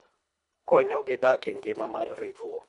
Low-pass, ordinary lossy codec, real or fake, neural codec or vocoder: 10.8 kHz; none; fake; codec, 24 kHz, 1.5 kbps, HILCodec